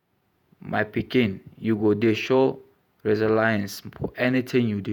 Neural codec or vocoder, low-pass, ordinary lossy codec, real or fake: vocoder, 48 kHz, 128 mel bands, Vocos; 19.8 kHz; none; fake